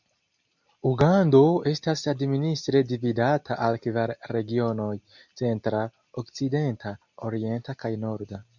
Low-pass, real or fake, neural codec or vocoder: 7.2 kHz; real; none